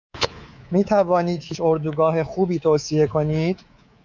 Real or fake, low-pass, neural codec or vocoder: fake; 7.2 kHz; codec, 24 kHz, 3.1 kbps, DualCodec